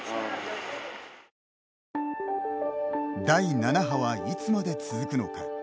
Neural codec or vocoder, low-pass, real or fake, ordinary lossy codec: none; none; real; none